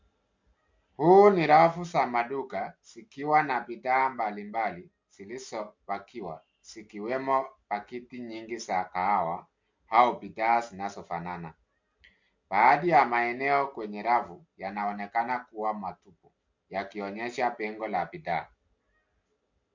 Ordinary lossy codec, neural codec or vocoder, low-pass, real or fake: MP3, 48 kbps; none; 7.2 kHz; real